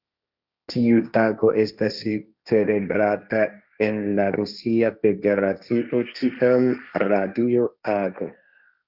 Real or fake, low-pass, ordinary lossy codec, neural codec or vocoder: fake; 5.4 kHz; Opus, 64 kbps; codec, 16 kHz, 1.1 kbps, Voila-Tokenizer